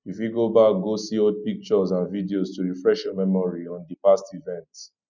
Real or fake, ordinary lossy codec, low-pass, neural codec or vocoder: real; none; 7.2 kHz; none